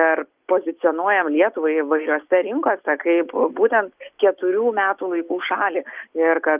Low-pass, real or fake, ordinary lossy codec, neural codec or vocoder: 3.6 kHz; real; Opus, 32 kbps; none